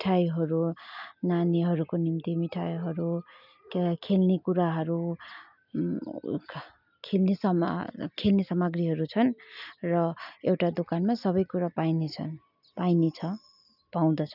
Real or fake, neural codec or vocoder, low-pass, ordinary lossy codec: real; none; 5.4 kHz; none